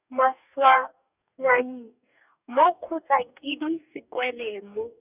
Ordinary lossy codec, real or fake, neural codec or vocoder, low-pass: none; fake; codec, 44.1 kHz, 2.6 kbps, DAC; 3.6 kHz